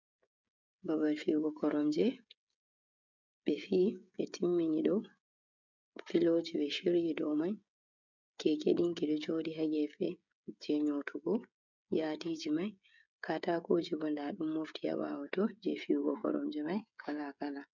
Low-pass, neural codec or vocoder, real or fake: 7.2 kHz; codec, 16 kHz, 16 kbps, FreqCodec, smaller model; fake